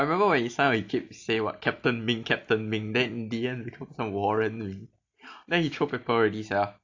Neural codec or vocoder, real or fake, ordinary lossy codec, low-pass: none; real; AAC, 48 kbps; 7.2 kHz